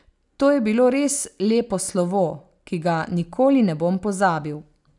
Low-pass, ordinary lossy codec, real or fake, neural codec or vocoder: 10.8 kHz; none; real; none